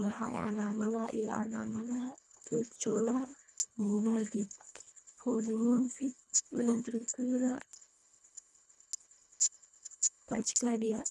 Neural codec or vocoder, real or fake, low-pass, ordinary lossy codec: codec, 24 kHz, 1.5 kbps, HILCodec; fake; none; none